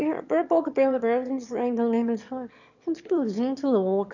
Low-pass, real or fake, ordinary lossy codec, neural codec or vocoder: 7.2 kHz; fake; none; autoencoder, 22.05 kHz, a latent of 192 numbers a frame, VITS, trained on one speaker